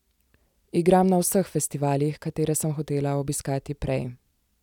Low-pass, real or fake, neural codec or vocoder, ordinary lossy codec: 19.8 kHz; real; none; none